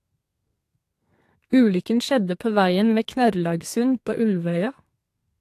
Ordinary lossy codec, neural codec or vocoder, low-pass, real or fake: AAC, 64 kbps; codec, 32 kHz, 1.9 kbps, SNAC; 14.4 kHz; fake